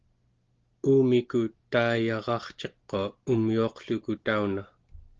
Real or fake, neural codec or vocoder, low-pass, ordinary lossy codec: real; none; 7.2 kHz; Opus, 16 kbps